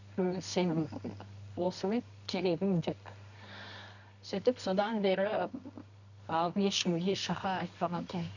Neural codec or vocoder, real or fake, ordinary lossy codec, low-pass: codec, 24 kHz, 0.9 kbps, WavTokenizer, medium music audio release; fake; none; 7.2 kHz